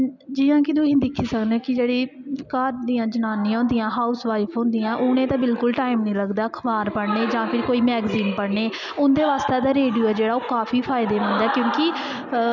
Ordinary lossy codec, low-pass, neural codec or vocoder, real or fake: none; 7.2 kHz; none; real